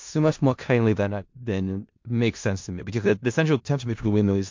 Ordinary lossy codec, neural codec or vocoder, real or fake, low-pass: MP3, 48 kbps; codec, 16 kHz in and 24 kHz out, 0.4 kbps, LongCat-Audio-Codec, four codebook decoder; fake; 7.2 kHz